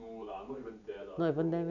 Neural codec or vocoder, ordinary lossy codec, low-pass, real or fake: none; none; 7.2 kHz; real